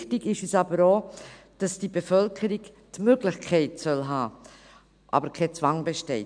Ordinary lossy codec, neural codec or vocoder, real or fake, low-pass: MP3, 96 kbps; none; real; 9.9 kHz